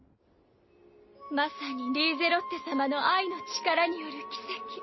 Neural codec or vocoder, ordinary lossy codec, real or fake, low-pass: none; MP3, 24 kbps; real; 7.2 kHz